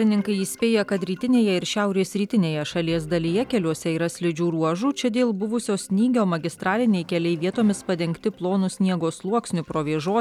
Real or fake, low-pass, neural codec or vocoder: real; 19.8 kHz; none